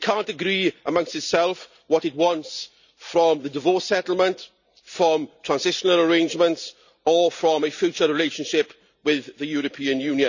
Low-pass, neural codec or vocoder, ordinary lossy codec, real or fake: 7.2 kHz; none; none; real